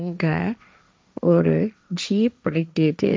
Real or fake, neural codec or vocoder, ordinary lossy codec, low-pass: fake; codec, 16 kHz, 1.1 kbps, Voila-Tokenizer; none; none